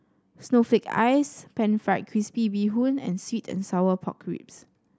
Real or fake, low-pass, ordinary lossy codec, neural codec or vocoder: real; none; none; none